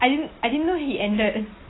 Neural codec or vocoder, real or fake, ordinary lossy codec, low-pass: none; real; AAC, 16 kbps; 7.2 kHz